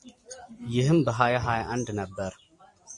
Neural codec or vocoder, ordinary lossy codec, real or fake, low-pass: vocoder, 44.1 kHz, 128 mel bands every 256 samples, BigVGAN v2; MP3, 48 kbps; fake; 10.8 kHz